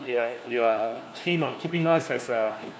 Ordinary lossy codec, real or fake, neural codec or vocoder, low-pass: none; fake; codec, 16 kHz, 1 kbps, FunCodec, trained on LibriTTS, 50 frames a second; none